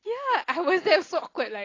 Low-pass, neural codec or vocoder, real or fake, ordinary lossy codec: 7.2 kHz; none; real; AAC, 32 kbps